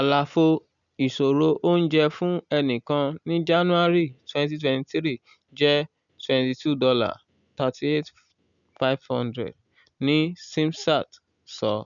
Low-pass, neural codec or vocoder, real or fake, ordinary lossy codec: 7.2 kHz; none; real; none